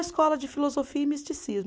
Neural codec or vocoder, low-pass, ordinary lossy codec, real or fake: none; none; none; real